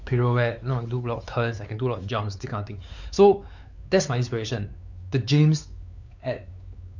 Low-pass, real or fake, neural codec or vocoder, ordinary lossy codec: 7.2 kHz; fake; codec, 16 kHz, 4 kbps, X-Codec, WavLM features, trained on Multilingual LibriSpeech; none